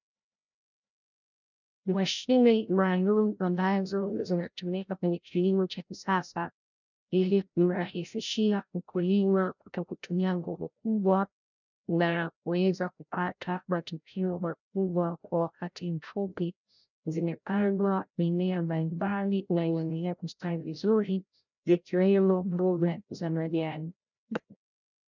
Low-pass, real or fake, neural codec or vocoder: 7.2 kHz; fake; codec, 16 kHz, 0.5 kbps, FreqCodec, larger model